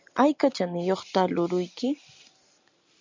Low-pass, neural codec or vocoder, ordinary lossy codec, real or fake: 7.2 kHz; none; MP3, 64 kbps; real